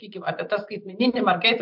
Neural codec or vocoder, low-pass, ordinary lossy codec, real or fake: vocoder, 44.1 kHz, 128 mel bands every 512 samples, BigVGAN v2; 5.4 kHz; MP3, 48 kbps; fake